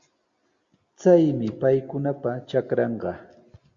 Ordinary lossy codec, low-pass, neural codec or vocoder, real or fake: Opus, 64 kbps; 7.2 kHz; none; real